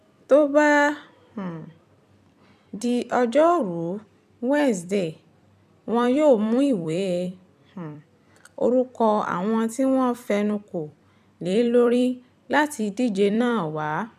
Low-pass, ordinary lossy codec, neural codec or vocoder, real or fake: 14.4 kHz; none; vocoder, 44.1 kHz, 128 mel bands every 256 samples, BigVGAN v2; fake